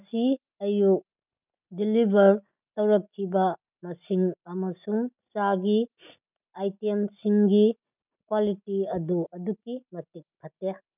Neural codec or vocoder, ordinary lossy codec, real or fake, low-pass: none; none; real; 3.6 kHz